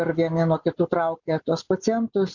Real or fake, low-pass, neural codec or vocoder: real; 7.2 kHz; none